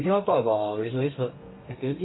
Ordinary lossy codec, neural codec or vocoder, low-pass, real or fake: AAC, 16 kbps; codec, 32 kHz, 1.9 kbps, SNAC; 7.2 kHz; fake